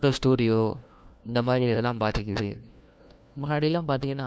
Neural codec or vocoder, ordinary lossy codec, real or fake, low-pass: codec, 16 kHz, 1 kbps, FunCodec, trained on LibriTTS, 50 frames a second; none; fake; none